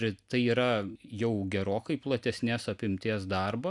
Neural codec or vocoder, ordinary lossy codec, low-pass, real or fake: none; MP3, 96 kbps; 10.8 kHz; real